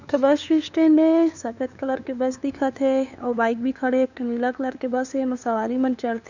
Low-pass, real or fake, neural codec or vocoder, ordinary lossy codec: 7.2 kHz; fake; codec, 16 kHz, 2 kbps, FunCodec, trained on LibriTTS, 25 frames a second; none